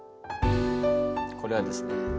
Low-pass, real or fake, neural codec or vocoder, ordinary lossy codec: none; real; none; none